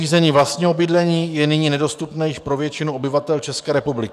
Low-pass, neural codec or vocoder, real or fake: 14.4 kHz; codec, 44.1 kHz, 7.8 kbps, DAC; fake